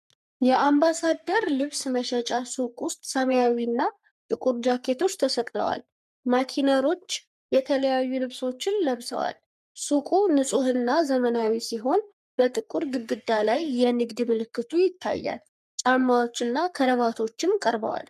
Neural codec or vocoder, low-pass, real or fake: codec, 44.1 kHz, 3.4 kbps, Pupu-Codec; 14.4 kHz; fake